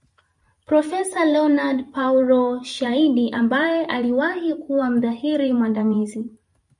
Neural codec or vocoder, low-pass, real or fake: vocoder, 44.1 kHz, 128 mel bands every 512 samples, BigVGAN v2; 10.8 kHz; fake